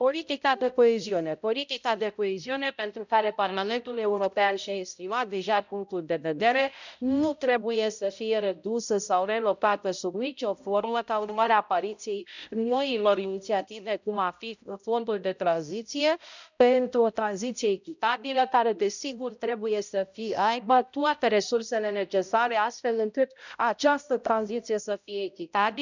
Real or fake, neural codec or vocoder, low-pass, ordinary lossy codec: fake; codec, 16 kHz, 0.5 kbps, X-Codec, HuBERT features, trained on balanced general audio; 7.2 kHz; none